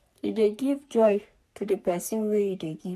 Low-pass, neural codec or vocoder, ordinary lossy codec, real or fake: 14.4 kHz; codec, 44.1 kHz, 3.4 kbps, Pupu-Codec; none; fake